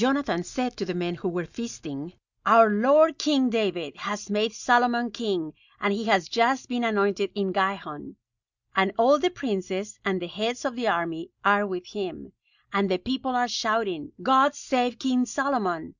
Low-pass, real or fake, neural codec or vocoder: 7.2 kHz; real; none